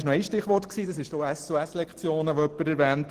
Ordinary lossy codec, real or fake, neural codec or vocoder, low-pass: Opus, 16 kbps; real; none; 14.4 kHz